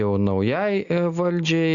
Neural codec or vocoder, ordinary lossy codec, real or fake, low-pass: none; Opus, 64 kbps; real; 7.2 kHz